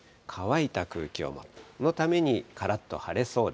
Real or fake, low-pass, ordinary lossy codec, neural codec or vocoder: real; none; none; none